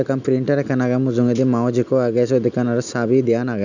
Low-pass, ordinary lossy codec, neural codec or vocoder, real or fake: 7.2 kHz; none; none; real